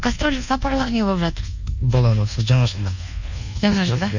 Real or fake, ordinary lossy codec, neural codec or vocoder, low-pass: fake; none; codec, 24 kHz, 1.2 kbps, DualCodec; 7.2 kHz